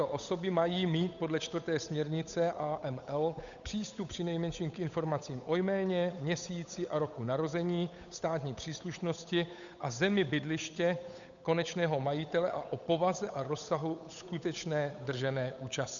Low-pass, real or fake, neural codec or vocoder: 7.2 kHz; fake; codec, 16 kHz, 8 kbps, FunCodec, trained on Chinese and English, 25 frames a second